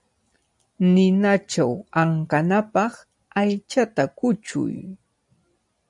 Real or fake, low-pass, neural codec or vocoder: real; 10.8 kHz; none